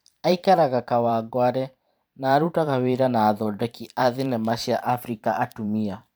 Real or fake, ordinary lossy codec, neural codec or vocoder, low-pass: fake; none; vocoder, 44.1 kHz, 128 mel bands every 512 samples, BigVGAN v2; none